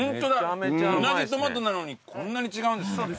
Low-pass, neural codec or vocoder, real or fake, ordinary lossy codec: none; none; real; none